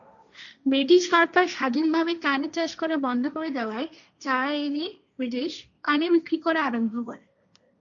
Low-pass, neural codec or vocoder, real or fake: 7.2 kHz; codec, 16 kHz, 1.1 kbps, Voila-Tokenizer; fake